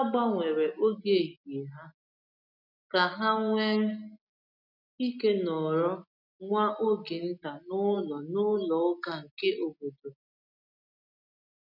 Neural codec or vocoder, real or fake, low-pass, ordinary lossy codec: none; real; 5.4 kHz; none